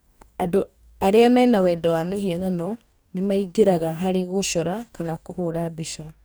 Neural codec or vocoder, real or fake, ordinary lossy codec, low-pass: codec, 44.1 kHz, 2.6 kbps, DAC; fake; none; none